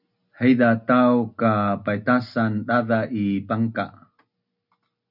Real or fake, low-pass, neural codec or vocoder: real; 5.4 kHz; none